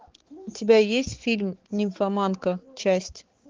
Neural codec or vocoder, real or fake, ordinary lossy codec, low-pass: codec, 16 kHz, 4 kbps, X-Codec, HuBERT features, trained on balanced general audio; fake; Opus, 16 kbps; 7.2 kHz